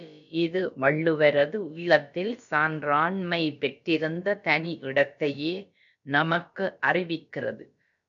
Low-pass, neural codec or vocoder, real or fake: 7.2 kHz; codec, 16 kHz, about 1 kbps, DyCAST, with the encoder's durations; fake